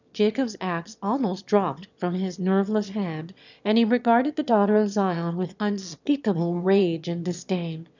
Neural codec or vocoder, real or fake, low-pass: autoencoder, 22.05 kHz, a latent of 192 numbers a frame, VITS, trained on one speaker; fake; 7.2 kHz